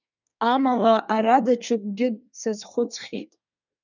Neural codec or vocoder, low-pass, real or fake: codec, 24 kHz, 1 kbps, SNAC; 7.2 kHz; fake